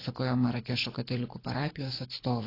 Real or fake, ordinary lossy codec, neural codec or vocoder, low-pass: fake; AAC, 24 kbps; codec, 24 kHz, 6 kbps, HILCodec; 5.4 kHz